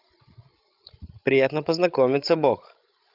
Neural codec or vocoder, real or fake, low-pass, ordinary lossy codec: codec, 16 kHz, 16 kbps, FreqCodec, larger model; fake; 5.4 kHz; Opus, 24 kbps